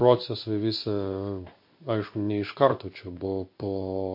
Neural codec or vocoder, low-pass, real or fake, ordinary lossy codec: codec, 16 kHz in and 24 kHz out, 1 kbps, XY-Tokenizer; 5.4 kHz; fake; MP3, 32 kbps